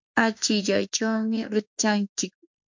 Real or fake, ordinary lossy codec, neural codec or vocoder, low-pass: fake; MP3, 48 kbps; autoencoder, 48 kHz, 32 numbers a frame, DAC-VAE, trained on Japanese speech; 7.2 kHz